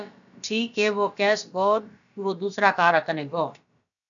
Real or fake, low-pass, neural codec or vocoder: fake; 7.2 kHz; codec, 16 kHz, about 1 kbps, DyCAST, with the encoder's durations